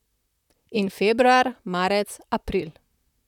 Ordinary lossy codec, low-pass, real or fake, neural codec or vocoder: none; 19.8 kHz; fake; vocoder, 44.1 kHz, 128 mel bands, Pupu-Vocoder